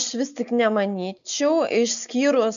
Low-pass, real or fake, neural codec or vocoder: 7.2 kHz; real; none